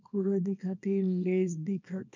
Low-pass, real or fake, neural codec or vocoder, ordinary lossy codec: 7.2 kHz; fake; codec, 24 kHz, 0.9 kbps, WavTokenizer, small release; none